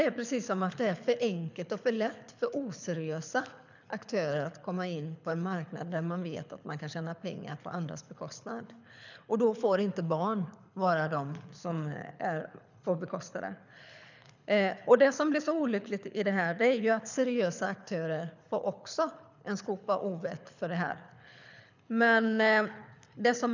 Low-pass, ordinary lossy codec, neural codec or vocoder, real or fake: 7.2 kHz; none; codec, 24 kHz, 6 kbps, HILCodec; fake